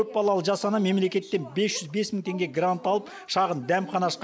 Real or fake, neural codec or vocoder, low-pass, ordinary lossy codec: real; none; none; none